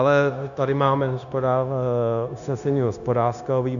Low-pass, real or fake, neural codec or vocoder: 7.2 kHz; fake; codec, 16 kHz, 0.9 kbps, LongCat-Audio-Codec